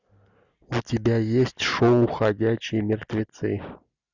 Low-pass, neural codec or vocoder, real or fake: 7.2 kHz; none; real